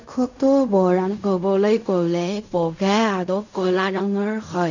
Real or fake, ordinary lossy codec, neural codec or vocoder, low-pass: fake; none; codec, 16 kHz in and 24 kHz out, 0.4 kbps, LongCat-Audio-Codec, fine tuned four codebook decoder; 7.2 kHz